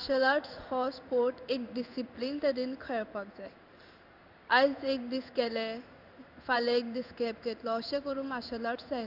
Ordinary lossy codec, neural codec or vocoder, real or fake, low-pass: none; codec, 16 kHz in and 24 kHz out, 1 kbps, XY-Tokenizer; fake; 5.4 kHz